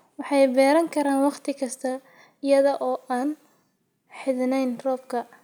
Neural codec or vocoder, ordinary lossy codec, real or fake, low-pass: none; none; real; none